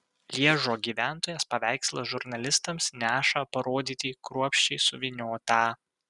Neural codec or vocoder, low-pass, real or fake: none; 10.8 kHz; real